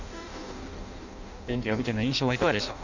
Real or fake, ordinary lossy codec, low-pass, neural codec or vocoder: fake; none; 7.2 kHz; codec, 16 kHz in and 24 kHz out, 0.6 kbps, FireRedTTS-2 codec